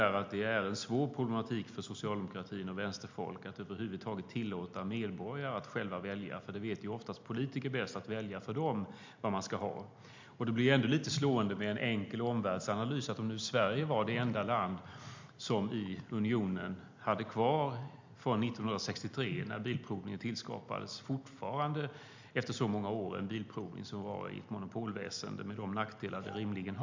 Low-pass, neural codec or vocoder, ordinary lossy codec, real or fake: 7.2 kHz; none; MP3, 64 kbps; real